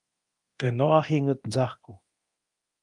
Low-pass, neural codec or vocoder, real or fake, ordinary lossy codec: 10.8 kHz; codec, 24 kHz, 0.9 kbps, DualCodec; fake; Opus, 32 kbps